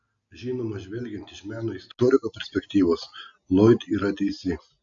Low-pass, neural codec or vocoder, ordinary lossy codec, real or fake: 7.2 kHz; none; AAC, 64 kbps; real